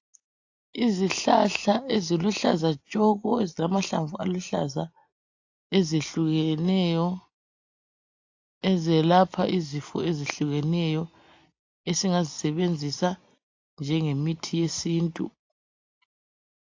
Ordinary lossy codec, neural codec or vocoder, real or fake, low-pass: AAC, 48 kbps; none; real; 7.2 kHz